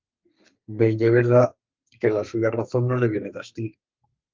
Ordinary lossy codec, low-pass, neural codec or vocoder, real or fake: Opus, 32 kbps; 7.2 kHz; codec, 32 kHz, 1.9 kbps, SNAC; fake